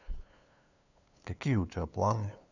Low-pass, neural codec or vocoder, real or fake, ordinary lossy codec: 7.2 kHz; codec, 16 kHz, 2 kbps, FunCodec, trained on LibriTTS, 25 frames a second; fake; none